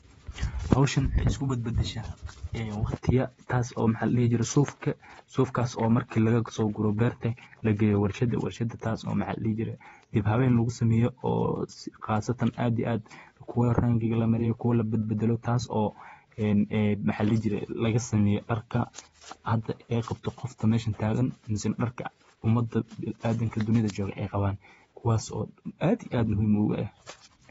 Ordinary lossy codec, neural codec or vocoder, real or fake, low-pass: AAC, 24 kbps; vocoder, 48 kHz, 128 mel bands, Vocos; fake; 19.8 kHz